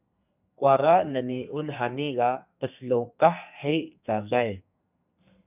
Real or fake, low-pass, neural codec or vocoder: fake; 3.6 kHz; codec, 32 kHz, 1.9 kbps, SNAC